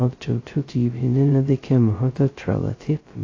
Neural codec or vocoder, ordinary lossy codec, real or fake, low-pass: codec, 16 kHz, 0.2 kbps, FocalCodec; AAC, 32 kbps; fake; 7.2 kHz